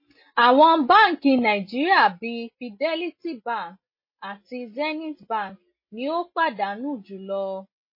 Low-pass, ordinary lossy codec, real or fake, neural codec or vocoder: 5.4 kHz; MP3, 24 kbps; real; none